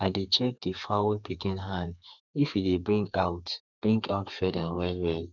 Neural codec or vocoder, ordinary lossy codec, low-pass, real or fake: codec, 32 kHz, 1.9 kbps, SNAC; none; 7.2 kHz; fake